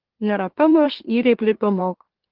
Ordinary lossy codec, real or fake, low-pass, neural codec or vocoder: Opus, 16 kbps; fake; 5.4 kHz; autoencoder, 44.1 kHz, a latent of 192 numbers a frame, MeloTTS